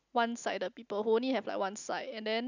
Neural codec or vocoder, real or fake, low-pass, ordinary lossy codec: none; real; 7.2 kHz; none